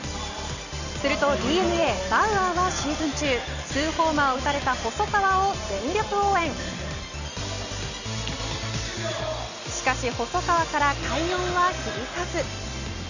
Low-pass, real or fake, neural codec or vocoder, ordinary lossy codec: 7.2 kHz; real; none; none